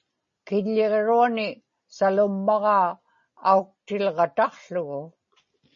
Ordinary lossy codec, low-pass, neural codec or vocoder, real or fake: MP3, 32 kbps; 7.2 kHz; none; real